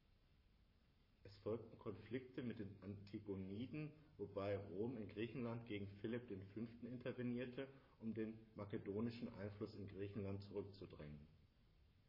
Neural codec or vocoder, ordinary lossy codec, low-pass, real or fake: codec, 44.1 kHz, 7.8 kbps, Pupu-Codec; MP3, 24 kbps; 5.4 kHz; fake